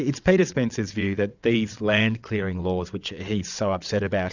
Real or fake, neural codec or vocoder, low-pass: fake; vocoder, 22.05 kHz, 80 mel bands, WaveNeXt; 7.2 kHz